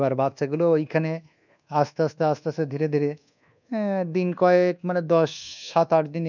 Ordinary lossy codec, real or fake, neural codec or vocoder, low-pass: none; fake; codec, 24 kHz, 1.2 kbps, DualCodec; 7.2 kHz